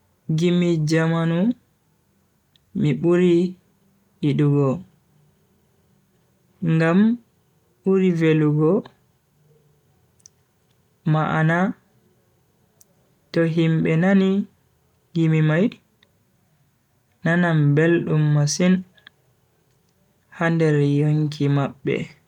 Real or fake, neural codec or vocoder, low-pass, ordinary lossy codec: real; none; 19.8 kHz; none